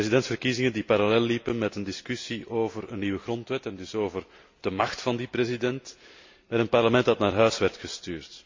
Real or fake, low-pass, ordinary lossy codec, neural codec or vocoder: real; 7.2 kHz; MP3, 48 kbps; none